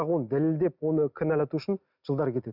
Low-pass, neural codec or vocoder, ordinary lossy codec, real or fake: 5.4 kHz; none; none; real